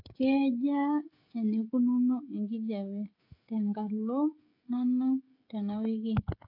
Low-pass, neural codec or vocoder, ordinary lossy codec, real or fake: 5.4 kHz; codec, 16 kHz, 16 kbps, FreqCodec, smaller model; AAC, 48 kbps; fake